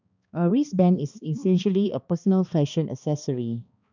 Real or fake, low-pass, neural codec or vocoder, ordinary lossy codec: fake; 7.2 kHz; codec, 16 kHz, 2 kbps, X-Codec, HuBERT features, trained on balanced general audio; none